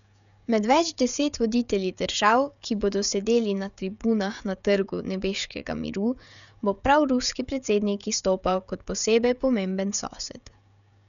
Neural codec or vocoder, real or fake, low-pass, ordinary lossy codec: codec, 16 kHz, 16 kbps, FreqCodec, smaller model; fake; 7.2 kHz; none